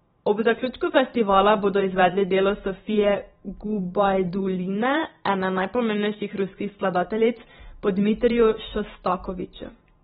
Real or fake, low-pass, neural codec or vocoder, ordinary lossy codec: fake; 19.8 kHz; vocoder, 44.1 kHz, 128 mel bands every 512 samples, BigVGAN v2; AAC, 16 kbps